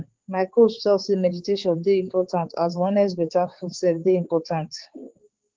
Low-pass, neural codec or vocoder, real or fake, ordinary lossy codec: 7.2 kHz; codec, 16 kHz, 4 kbps, X-Codec, HuBERT features, trained on balanced general audio; fake; Opus, 16 kbps